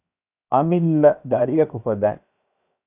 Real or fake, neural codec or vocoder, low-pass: fake; codec, 16 kHz, 0.7 kbps, FocalCodec; 3.6 kHz